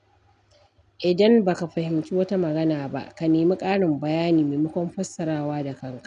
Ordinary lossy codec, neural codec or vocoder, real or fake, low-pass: none; none; real; 10.8 kHz